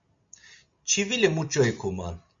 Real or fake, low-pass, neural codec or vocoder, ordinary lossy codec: real; 7.2 kHz; none; MP3, 48 kbps